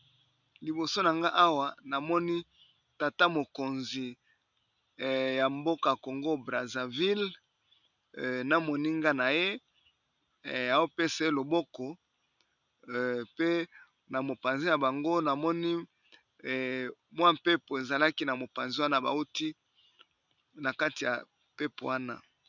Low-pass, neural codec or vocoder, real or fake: 7.2 kHz; none; real